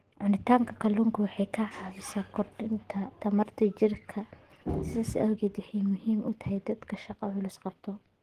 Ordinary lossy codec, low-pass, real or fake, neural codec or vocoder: Opus, 16 kbps; 14.4 kHz; fake; codec, 44.1 kHz, 7.8 kbps, DAC